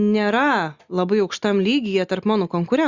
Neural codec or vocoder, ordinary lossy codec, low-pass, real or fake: none; Opus, 64 kbps; 7.2 kHz; real